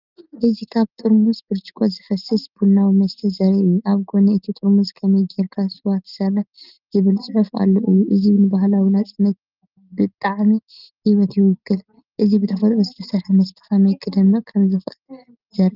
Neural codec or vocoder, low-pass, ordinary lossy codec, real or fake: none; 5.4 kHz; Opus, 32 kbps; real